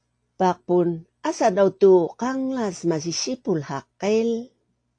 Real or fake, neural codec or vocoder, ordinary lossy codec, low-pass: real; none; AAC, 48 kbps; 9.9 kHz